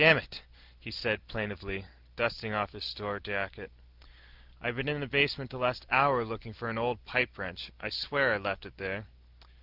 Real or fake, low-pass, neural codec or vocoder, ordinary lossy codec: real; 5.4 kHz; none; Opus, 24 kbps